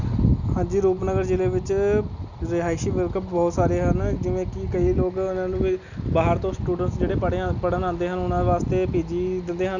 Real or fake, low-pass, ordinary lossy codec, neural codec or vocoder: real; 7.2 kHz; none; none